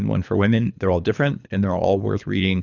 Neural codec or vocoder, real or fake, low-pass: codec, 24 kHz, 3 kbps, HILCodec; fake; 7.2 kHz